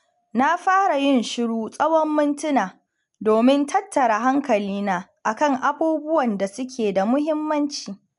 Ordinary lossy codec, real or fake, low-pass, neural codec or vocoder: MP3, 96 kbps; real; 10.8 kHz; none